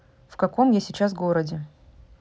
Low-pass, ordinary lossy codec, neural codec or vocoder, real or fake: none; none; none; real